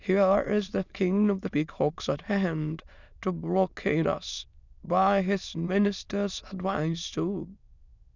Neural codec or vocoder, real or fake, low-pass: autoencoder, 22.05 kHz, a latent of 192 numbers a frame, VITS, trained on many speakers; fake; 7.2 kHz